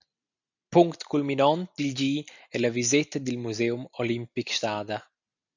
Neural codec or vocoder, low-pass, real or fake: none; 7.2 kHz; real